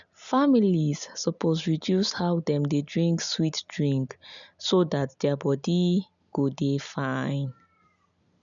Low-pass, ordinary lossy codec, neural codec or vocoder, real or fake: 7.2 kHz; none; none; real